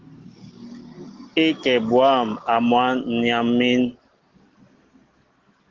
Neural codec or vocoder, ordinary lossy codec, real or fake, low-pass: none; Opus, 16 kbps; real; 7.2 kHz